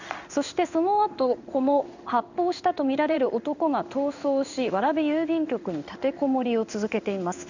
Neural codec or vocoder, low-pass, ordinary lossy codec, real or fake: codec, 16 kHz in and 24 kHz out, 1 kbps, XY-Tokenizer; 7.2 kHz; none; fake